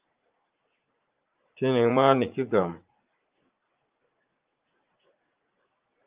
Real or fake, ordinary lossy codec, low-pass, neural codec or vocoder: fake; Opus, 32 kbps; 3.6 kHz; vocoder, 44.1 kHz, 80 mel bands, Vocos